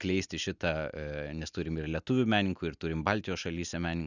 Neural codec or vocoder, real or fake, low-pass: none; real; 7.2 kHz